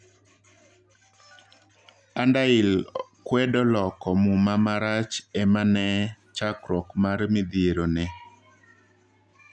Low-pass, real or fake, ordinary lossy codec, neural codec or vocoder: 9.9 kHz; real; none; none